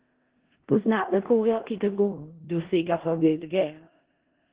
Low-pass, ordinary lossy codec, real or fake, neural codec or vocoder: 3.6 kHz; Opus, 16 kbps; fake; codec, 16 kHz in and 24 kHz out, 0.4 kbps, LongCat-Audio-Codec, four codebook decoder